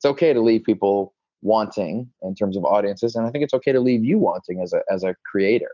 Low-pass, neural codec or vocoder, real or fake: 7.2 kHz; none; real